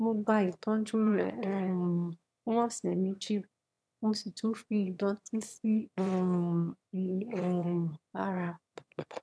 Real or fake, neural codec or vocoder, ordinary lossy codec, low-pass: fake; autoencoder, 22.05 kHz, a latent of 192 numbers a frame, VITS, trained on one speaker; none; none